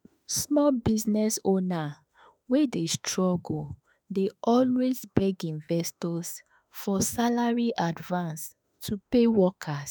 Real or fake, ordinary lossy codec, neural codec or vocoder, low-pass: fake; none; autoencoder, 48 kHz, 32 numbers a frame, DAC-VAE, trained on Japanese speech; none